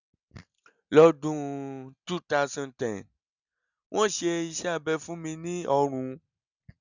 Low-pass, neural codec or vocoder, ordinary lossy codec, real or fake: 7.2 kHz; none; none; real